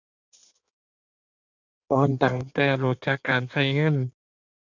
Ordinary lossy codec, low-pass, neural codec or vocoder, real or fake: none; 7.2 kHz; codec, 16 kHz in and 24 kHz out, 1.1 kbps, FireRedTTS-2 codec; fake